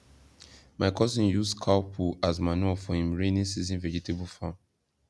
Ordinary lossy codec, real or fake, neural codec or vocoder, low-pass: none; real; none; none